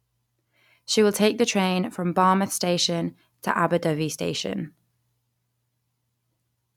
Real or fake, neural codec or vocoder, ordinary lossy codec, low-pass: real; none; none; 19.8 kHz